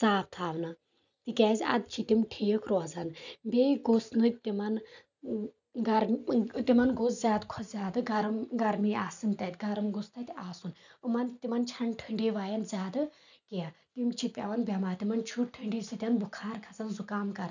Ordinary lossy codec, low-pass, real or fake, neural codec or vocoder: none; 7.2 kHz; real; none